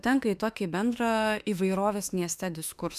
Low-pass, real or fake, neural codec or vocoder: 14.4 kHz; fake; autoencoder, 48 kHz, 32 numbers a frame, DAC-VAE, trained on Japanese speech